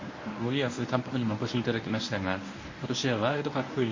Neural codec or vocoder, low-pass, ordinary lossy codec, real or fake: codec, 24 kHz, 0.9 kbps, WavTokenizer, medium speech release version 1; 7.2 kHz; MP3, 32 kbps; fake